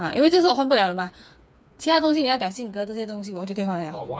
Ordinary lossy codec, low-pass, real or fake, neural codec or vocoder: none; none; fake; codec, 16 kHz, 4 kbps, FreqCodec, smaller model